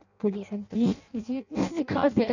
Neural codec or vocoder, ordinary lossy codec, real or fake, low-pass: codec, 16 kHz in and 24 kHz out, 0.6 kbps, FireRedTTS-2 codec; none; fake; 7.2 kHz